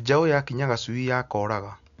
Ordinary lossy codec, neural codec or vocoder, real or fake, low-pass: none; none; real; 7.2 kHz